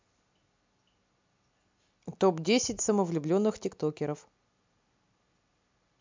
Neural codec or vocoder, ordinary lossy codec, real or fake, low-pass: none; MP3, 64 kbps; real; 7.2 kHz